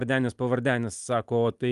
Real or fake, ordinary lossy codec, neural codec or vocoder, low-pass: real; Opus, 32 kbps; none; 10.8 kHz